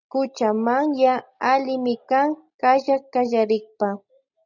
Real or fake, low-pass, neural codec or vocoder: real; 7.2 kHz; none